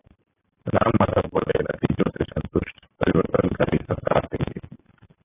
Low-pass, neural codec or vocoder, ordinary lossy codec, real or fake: 3.6 kHz; vocoder, 44.1 kHz, 128 mel bands every 512 samples, BigVGAN v2; AAC, 16 kbps; fake